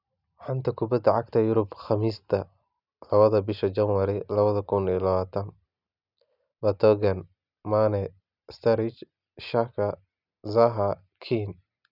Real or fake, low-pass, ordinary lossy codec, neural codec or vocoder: real; 5.4 kHz; none; none